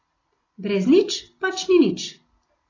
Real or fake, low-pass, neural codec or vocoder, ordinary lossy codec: real; 7.2 kHz; none; AAC, 32 kbps